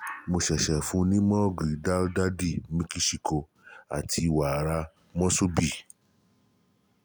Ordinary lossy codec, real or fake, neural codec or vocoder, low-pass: none; real; none; none